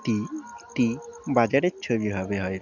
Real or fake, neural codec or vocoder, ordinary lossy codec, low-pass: real; none; none; 7.2 kHz